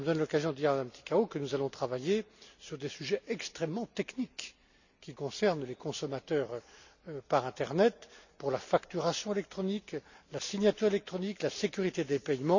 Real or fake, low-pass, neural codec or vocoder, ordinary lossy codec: real; 7.2 kHz; none; none